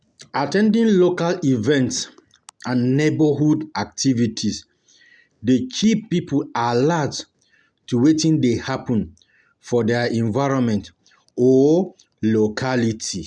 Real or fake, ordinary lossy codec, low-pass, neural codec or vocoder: real; none; 9.9 kHz; none